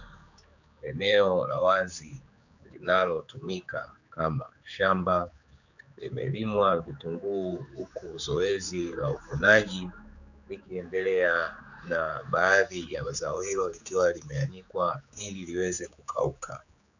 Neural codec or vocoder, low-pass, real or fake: codec, 16 kHz, 4 kbps, X-Codec, HuBERT features, trained on general audio; 7.2 kHz; fake